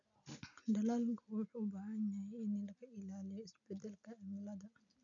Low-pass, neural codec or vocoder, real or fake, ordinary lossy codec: 7.2 kHz; none; real; none